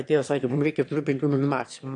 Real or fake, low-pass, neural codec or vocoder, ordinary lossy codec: fake; 9.9 kHz; autoencoder, 22.05 kHz, a latent of 192 numbers a frame, VITS, trained on one speaker; AAC, 48 kbps